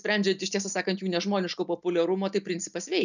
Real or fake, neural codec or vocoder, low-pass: real; none; 7.2 kHz